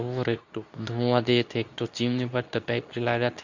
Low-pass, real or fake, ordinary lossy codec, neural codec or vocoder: 7.2 kHz; fake; Opus, 64 kbps; codec, 24 kHz, 0.9 kbps, WavTokenizer, medium speech release version 2